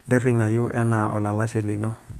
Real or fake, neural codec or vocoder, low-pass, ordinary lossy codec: fake; codec, 32 kHz, 1.9 kbps, SNAC; 14.4 kHz; none